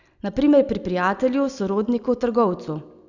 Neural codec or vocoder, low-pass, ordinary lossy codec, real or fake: none; 7.2 kHz; none; real